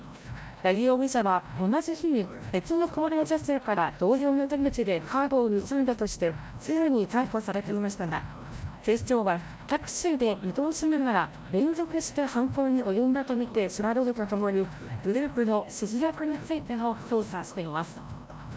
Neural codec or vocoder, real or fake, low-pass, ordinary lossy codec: codec, 16 kHz, 0.5 kbps, FreqCodec, larger model; fake; none; none